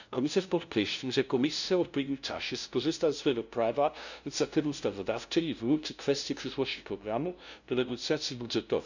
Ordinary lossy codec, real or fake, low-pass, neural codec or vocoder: none; fake; 7.2 kHz; codec, 16 kHz, 0.5 kbps, FunCodec, trained on LibriTTS, 25 frames a second